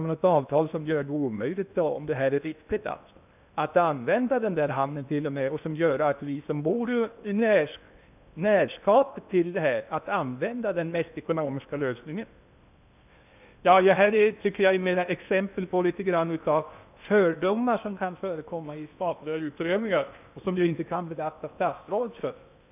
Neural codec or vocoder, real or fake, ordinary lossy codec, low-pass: codec, 16 kHz in and 24 kHz out, 0.8 kbps, FocalCodec, streaming, 65536 codes; fake; none; 3.6 kHz